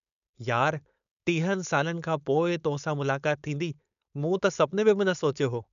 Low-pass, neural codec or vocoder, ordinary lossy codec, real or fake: 7.2 kHz; codec, 16 kHz, 4.8 kbps, FACodec; none; fake